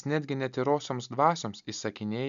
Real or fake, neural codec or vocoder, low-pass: real; none; 7.2 kHz